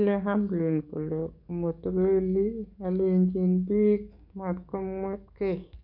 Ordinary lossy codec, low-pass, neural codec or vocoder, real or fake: none; 5.4 kHz; codec, 44.1 kHz, 7.8 kbps, DAC; fake